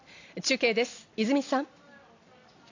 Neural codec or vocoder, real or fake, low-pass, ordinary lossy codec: none; real; 7.2 kHz; AAC, 48 kbps